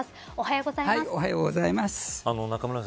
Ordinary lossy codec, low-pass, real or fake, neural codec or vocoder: none; none; real; none